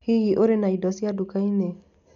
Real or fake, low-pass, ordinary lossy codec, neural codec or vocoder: real; 7.2 kHz; MP3, 96 kbps; none